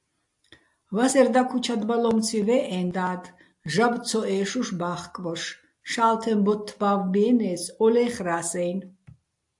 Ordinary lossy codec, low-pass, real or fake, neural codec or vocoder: AAC, 64 kbps; 10.8 kHz; real; none